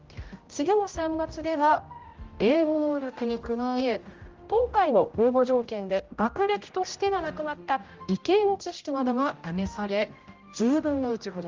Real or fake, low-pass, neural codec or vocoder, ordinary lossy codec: fake; 7.2 kHz; codec, 16 kHz, 0.5 kbps, X-Codec, HuBERT features, trained on general audio; Opus, 32 kbps